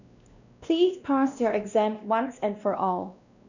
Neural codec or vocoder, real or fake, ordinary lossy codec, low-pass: codec, 16 kHz, 1 kbps, X-Codec, WavLM features, trained on Multilingual LibriSpeech; fake; none; 7.2 kHz